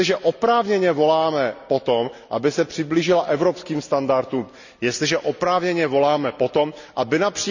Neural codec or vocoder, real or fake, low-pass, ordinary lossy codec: none; real; 7.2 kHz; none